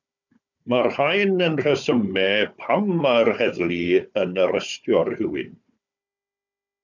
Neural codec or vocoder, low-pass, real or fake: codec, 16 kHz, 4 kbps, FunCodec, trained on Chinese and English, 50 frames a second; 7.2 kHz; fake